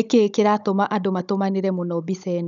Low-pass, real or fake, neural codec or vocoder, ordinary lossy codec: 7.2 kHz; fake; codec, 16 kHz, 16 kbps, FunCodec, trained on Chinese and English, 50 frames a second; none